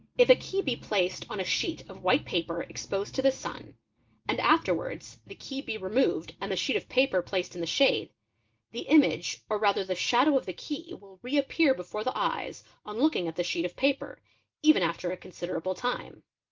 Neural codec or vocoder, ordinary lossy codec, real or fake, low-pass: none; Opus, 32 kbps; real; 7.2 kHz